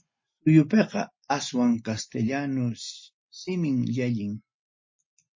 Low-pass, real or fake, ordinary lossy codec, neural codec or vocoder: 7.2 kHz; real; MP3, 32 kbps; none